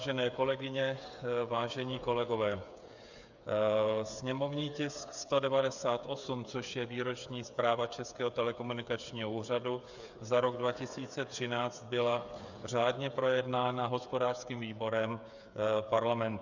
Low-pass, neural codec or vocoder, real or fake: 7.2 kHz; codec, 16 kHz, 8 kbps, FreqCodec, smaller model; fake